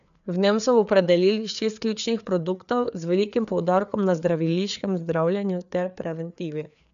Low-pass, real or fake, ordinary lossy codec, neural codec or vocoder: 7.2 kHz; fake; none; codec, 16 kHz, 4 kbps, FreqCodec, larger model